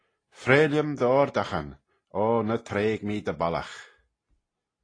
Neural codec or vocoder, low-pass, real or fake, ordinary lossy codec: none; 9.9 kHz; real; AAC, 32 kbps